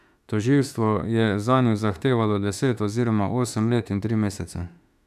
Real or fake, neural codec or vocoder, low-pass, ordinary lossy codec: fake; autoencoder, 48 kHz, 32 numbers a frame, DAC-VAE, trained on Japanese speech; 14.4 kHz; none